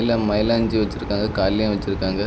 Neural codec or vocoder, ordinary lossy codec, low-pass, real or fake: none; none; none; real